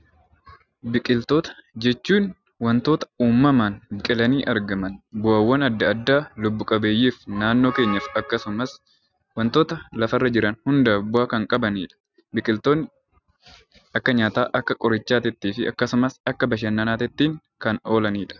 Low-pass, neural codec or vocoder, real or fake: 7.2 kHz; none; real